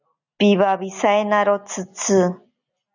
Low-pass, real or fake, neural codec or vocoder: 7.2 kHz; real; none